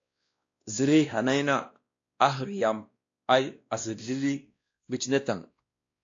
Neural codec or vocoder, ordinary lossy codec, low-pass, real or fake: codec, 16 kHz, 1 kbps, X-Codec, WavLM features, trained on Multilingual LibriSpeech; AAC, 48 kbps; 7.2 kHz; fake